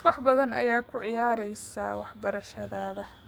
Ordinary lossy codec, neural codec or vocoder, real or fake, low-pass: none; codec, 44.1 kHz, 2.6 kbps, SNAC; fake; none